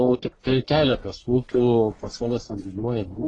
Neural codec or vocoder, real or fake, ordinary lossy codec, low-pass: codec, 44.1 kHz, 1.7 kbps, Pupu-Codec; fake; AAC, 32 kbps; 10.8 kHz